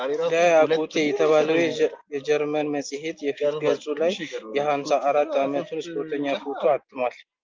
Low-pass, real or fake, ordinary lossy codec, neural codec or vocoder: 7.2 kHz; real; Opus, 24 kbps; none